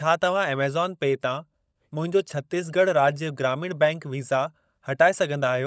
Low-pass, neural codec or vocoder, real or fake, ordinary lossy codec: none; codec, 16 kHz, 16 kbps, FunCodec, trained on LibriTTS, 50 frames a second; fake; none